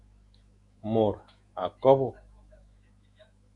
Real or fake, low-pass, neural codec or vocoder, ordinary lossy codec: fake; 10.8 kHz; autoencoder, 48 kHz, 128 numbers a frame, DAC-VAE, trained on Japanese speech; Opus, 64 kbps